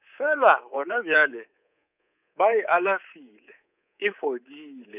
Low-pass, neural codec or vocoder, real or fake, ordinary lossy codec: 3.6 kHz; codec, 24 kHz, 3.1 kbps, DualCodec; fake; none